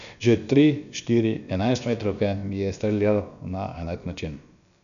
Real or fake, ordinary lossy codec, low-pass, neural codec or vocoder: fake; none; 7.2 kHz; codec, 16 kHz, about 1 kbps, DyCAST, with the encoder's durations